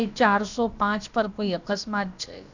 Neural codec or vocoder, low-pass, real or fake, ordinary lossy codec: codec, 16 kHz, about 1 kbps, DyCAST, with the encoder's durations; 7.2 kHz; fake; none